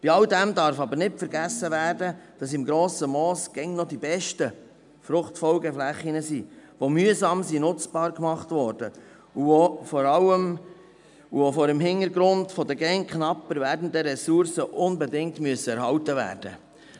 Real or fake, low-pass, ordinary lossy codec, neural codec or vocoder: real; 10.8 kHz; none; none